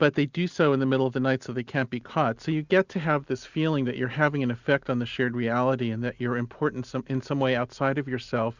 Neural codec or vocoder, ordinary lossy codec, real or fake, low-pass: none; Opus, 64 kbps; real; 7.2 kHz